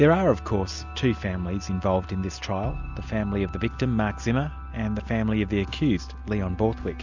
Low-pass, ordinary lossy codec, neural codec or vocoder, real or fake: 7.2 kHz; Opus, 64 kbps; none; real